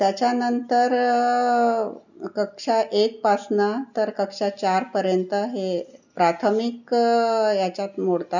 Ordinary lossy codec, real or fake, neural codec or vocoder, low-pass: none; real; none; 7.2 kHz